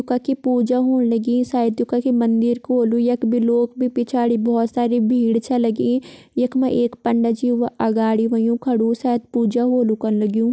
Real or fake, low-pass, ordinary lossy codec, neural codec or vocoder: real; none; none; none